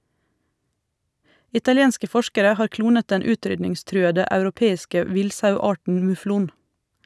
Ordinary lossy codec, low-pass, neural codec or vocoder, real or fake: none; none; none; real